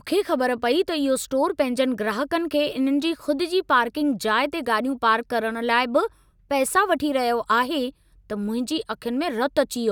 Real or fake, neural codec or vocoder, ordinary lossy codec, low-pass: real; none; none; 19.8 kHz